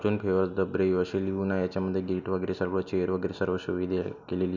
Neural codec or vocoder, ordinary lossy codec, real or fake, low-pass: none; none; real; 7.2 kHz